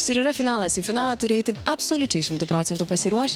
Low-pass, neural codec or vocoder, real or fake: 19.8 kHz; codec, 44.1 kHz, 2.6 kbps, DAC; fake